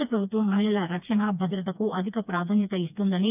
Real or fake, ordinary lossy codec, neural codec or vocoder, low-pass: fake; none; codec, 16 kHz, 2 kbps, FreqCodec, smaller model; 3.6 kHz